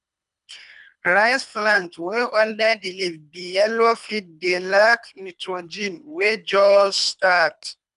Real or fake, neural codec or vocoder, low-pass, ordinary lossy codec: fake; codec, 24 kHz, 3 kbps, HILCodec; 10.8 kHz; none